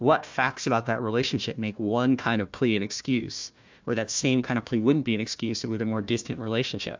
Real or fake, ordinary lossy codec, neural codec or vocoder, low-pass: fake; MP3, 64 kbps; codec, 16 kHz, 1 kbps, FunCodec, trained on Chinese and English, 50 frames a second; 7.2 kHz